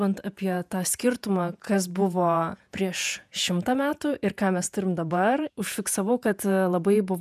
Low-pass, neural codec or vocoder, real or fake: 14.4 kHz; vocoder, 48 kHz, 128 mel bands, Vocos; fake